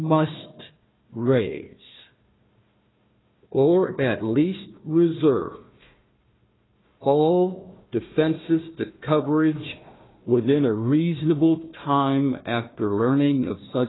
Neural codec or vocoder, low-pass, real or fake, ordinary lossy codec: codec, 16 kHz, 1 kbps, FunCodec, trained on Chinese and English, 50 frames a second; 7.2 kHz; fake; AAC, 16 kbps